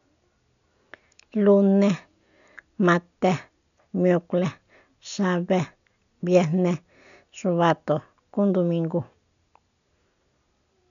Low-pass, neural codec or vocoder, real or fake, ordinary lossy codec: 7.2 kHz; none; real; none